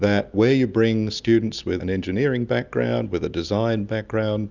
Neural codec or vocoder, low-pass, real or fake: none; 7.2 kHz; real